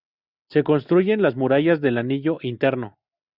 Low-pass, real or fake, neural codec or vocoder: 5.4 kHz; real; none